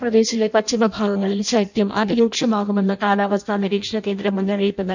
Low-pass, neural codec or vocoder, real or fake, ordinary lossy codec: 7.2 kHz; codec, 16 kHz in and 24 kHz out, 0.6 kbps, FireRedTTS-2 codec; fake; none